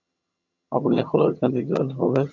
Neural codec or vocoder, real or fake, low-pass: vocoder, 22.05 kHz, 80 mel bands, HiFi-GAN; fake; 7.2 kHz